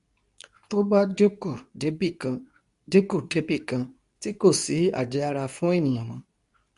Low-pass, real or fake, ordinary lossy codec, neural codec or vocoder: 10.8 kHz; fake; none; codec, 24 kHz, 0.9 kbps, WavTokenizer, medium speech release version 2